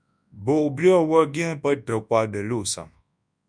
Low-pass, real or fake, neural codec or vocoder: 9.9 kHz; fake; codec, 24 kHz, 0.9 kbps, WavTokenizer, large speech release